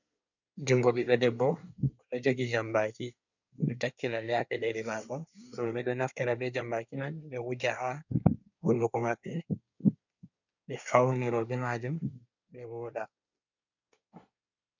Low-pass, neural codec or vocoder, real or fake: 7.2 kHz; codec, 24 kHz, 1 kbps, SNAC; fake